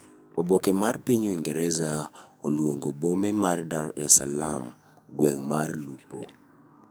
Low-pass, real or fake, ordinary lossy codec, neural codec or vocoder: none; fake; none; codec, 44.1 kHz, 2.6 kbps, SNAC